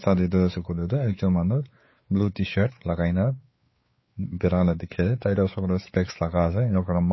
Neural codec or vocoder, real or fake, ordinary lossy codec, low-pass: codec, 16 kHz, 4 kbps, X-Codec, WavLM features, trained on Multilingual LibriSpeech; fake; MP3, 24 kbps; 7.2 kHz